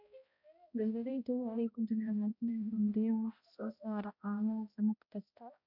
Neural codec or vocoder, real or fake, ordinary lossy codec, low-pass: codec, 16 kHz, 0.5 kbps, X-Codec, HuBERT features, trained on balanced general audio; fake; MP3, 48 kbps; 5.4 kHz